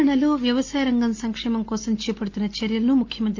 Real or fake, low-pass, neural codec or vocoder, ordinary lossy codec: real; 7.2 kHz; none; Opus, 32 kbps